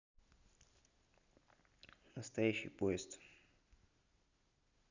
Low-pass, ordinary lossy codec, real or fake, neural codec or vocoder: 7.2 kHz; none; real; none